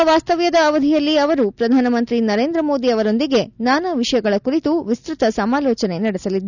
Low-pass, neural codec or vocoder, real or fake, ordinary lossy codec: 7.2 kHz; none; real; none